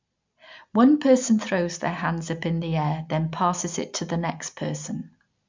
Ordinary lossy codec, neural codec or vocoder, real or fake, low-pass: MP3, 64 kbps; none; real; 7.2 kHz